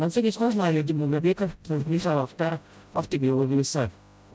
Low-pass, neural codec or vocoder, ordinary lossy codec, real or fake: none; codec, 16 kHz, 0.5 kbps, FreqCodec, smaller model; none; fake